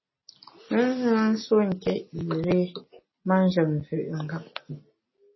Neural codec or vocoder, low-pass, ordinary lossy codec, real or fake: none; 7.2 kHz; MP3, 24 kbps; real